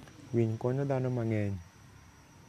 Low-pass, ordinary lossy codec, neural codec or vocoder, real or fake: 14.4 kHz; MP3, 96 kbps; none; real